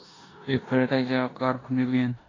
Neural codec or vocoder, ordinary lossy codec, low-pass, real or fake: codec, 16 kHz in and 24 kHz out, 0.9 kbps, LongCat-Audio-Codec, four codebook decoder; AAC, 32 kbps; 7.2 kHz; fake